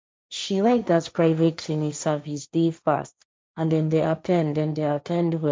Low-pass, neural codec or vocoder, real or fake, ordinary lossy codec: none; codec, 16 kHz, 1.1 kbps, Voila-Tokenizer; fake; none